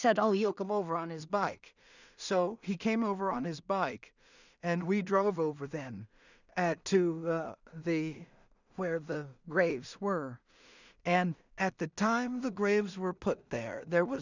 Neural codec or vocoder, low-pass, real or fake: codec, 16 kHz in and 24 kHz out, 0.4 kbps, LongCat-Audio-Codec, two codebook decoder; 7.2 kHz; fake